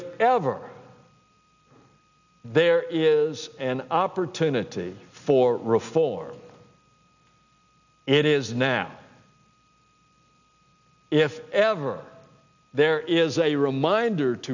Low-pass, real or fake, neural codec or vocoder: 7.2 kHz; real; none